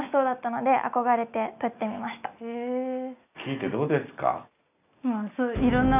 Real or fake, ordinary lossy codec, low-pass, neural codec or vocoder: real; none; 3.6 kHz; none